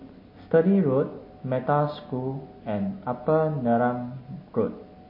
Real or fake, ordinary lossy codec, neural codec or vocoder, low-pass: real; MP3, 24 kbps; none; 5.4 kHz